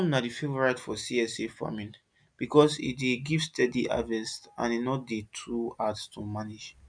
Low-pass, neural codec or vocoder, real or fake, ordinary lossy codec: 9.9 kHz; none; real; none